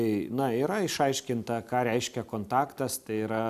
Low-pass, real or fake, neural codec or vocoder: 14.4 kHz; real; none